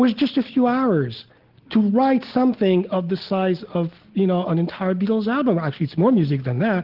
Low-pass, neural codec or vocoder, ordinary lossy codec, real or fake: 5.4 kHz; none; Opus, 16 kbps; real